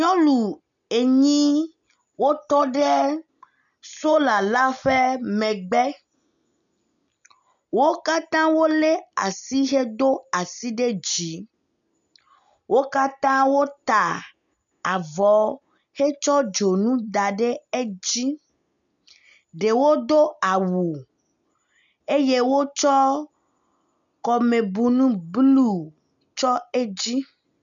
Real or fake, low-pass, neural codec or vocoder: real; 7.2 kHz; none